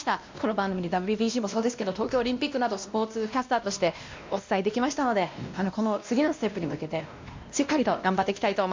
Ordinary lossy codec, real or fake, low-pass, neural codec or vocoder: MP3, 64 kbps; fake; 7.2 kHz; codec, 16 kHz, 1 kbps, X-Codec, WavLM features, trained on Multilingual LibriSpeech